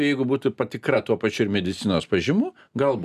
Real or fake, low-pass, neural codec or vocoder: real; 14.4 kHz; none